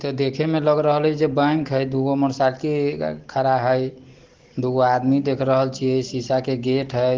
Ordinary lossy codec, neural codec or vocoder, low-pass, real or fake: Opus, 16 kbps; none; 7.2 kHz; real